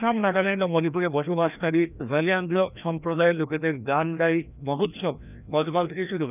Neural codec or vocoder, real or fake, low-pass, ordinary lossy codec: codec, 16 kHz, 1 kbps, FreqCodec, larger model; fake; 3.6 kHz; none